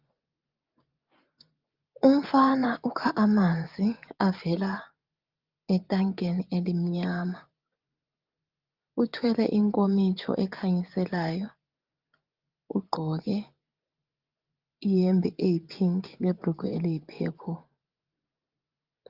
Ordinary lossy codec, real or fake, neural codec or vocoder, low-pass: Opus, 32 kbps; fake; vocoder, 24 kHz, 100 mel bands, Vocos; 5.4 kHz